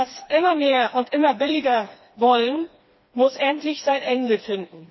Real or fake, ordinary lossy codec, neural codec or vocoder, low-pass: fake; MP3, 24 kbps; codec, 16 kHz, 2 kbps, FreqCodec, smaller model; 7.2 kHz